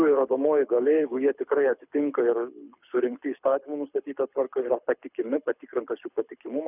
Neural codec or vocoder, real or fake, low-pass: codec, 24 kHz, 6 kbps, HILCodec; fake; 3.6 kHz